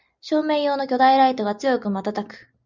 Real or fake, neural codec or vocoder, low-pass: real; none; 7.2 kHz